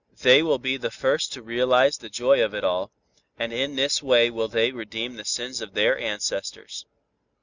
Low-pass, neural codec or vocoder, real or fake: 7.2 kHz; none; real